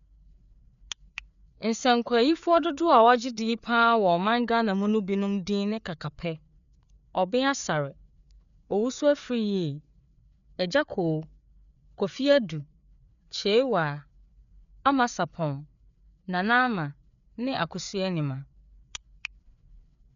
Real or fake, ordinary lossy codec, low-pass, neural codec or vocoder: fake; none; 7.2 kHz; codec, 16 kHz, 4 kbps, FreqCodec, larger model